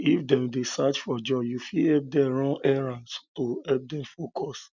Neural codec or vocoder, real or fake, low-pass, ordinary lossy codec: none; real; 7.2 kHz; none